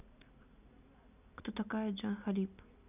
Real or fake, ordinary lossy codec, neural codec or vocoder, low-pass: real; none; none; 3.6 kHz